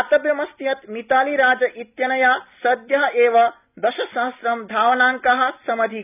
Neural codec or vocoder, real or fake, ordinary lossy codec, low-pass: none; real; none; 3.6 kHz